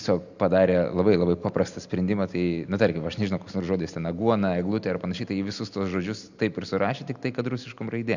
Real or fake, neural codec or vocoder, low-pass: real; none; 7.2 kHz